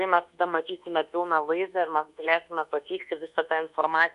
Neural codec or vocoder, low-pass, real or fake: codec, 24 kHz, 1.2 kbps, DualCodec; 10.8 kHz; fake